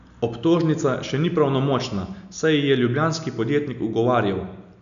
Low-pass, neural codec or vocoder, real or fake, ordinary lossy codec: 7.2 kHz; none; real; none